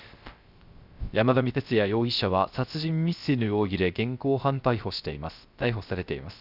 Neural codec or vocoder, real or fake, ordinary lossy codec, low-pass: codec, 16 kHz, 0.3 kbps, FocalCodec; fake; Opus, 64 kbps; 5.4 kHz